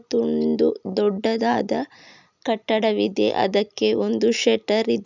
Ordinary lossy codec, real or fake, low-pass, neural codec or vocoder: none; real; 7.2 kHz; none